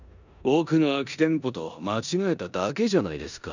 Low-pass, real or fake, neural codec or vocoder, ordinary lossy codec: 7.2 kHz; fake; codec, 16 kHz in and 24 kHz out, 0.9 kbps, LongCat-Audio-Codec, four codebook decoder; none